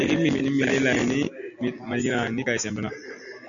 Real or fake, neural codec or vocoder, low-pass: real; none; 7.2 kHz